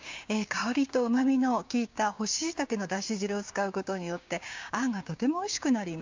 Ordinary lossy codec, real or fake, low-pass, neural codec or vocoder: MP3, 64 kbps; fake; 7.2 kHz; codec, 16 kHz, 6 kbps, DAC